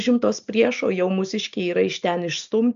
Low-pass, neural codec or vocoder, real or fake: 7.2 kHz; none; real